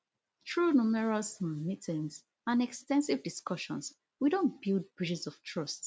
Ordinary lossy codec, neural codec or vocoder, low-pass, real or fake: none; none; none; real